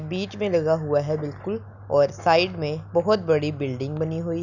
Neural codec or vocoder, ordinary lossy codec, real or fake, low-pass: none; none; real; 7.2 kHz